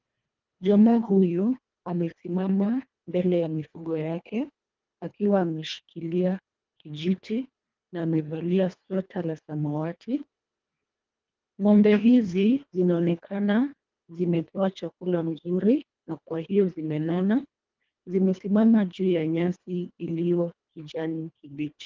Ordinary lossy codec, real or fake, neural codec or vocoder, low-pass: Opus, 32 kbps; fake; codec, 24 kHz, 1.5 kbps, HILCodec; 7.2 kHz